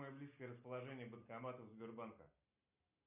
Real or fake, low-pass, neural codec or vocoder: real; 3.6 kHz; none